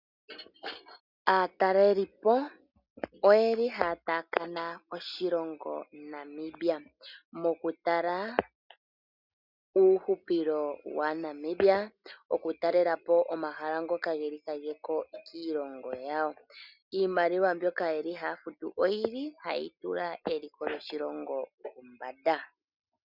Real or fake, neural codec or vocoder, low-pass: real; none; 5.4 kHz